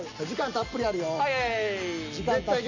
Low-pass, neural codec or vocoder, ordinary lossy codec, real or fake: 7.2 kHz; none; none; real